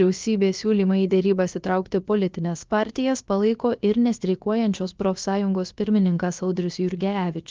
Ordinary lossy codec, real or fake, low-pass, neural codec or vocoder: Opus, 24 kbps; fake; 7.2 kHz; codec, 16 kHz, about 1 kbps, DyCAST, with the encoder's durations